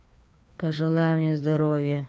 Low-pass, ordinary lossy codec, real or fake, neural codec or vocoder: none; none; fake; codec, 16 kHz, 2 kbps, FreqCodec, larger model